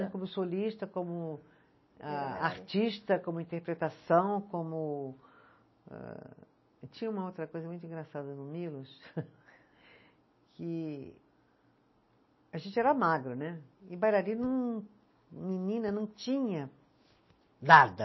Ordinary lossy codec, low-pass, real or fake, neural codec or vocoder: MP3, 24 kbps; 7.2 kHz; real; none